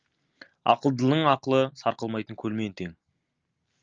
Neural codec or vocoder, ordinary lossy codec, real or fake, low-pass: none; Opus, 24 kbps; real; 7.2 kHz